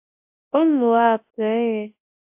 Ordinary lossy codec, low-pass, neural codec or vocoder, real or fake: AAC, 32 kbps; 3.6 kHz; codec, 24 kHz, 0.9 kbps, WavTokenizer, large speech release; fake